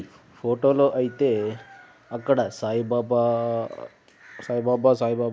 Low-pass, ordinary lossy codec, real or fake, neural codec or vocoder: none; none; real; none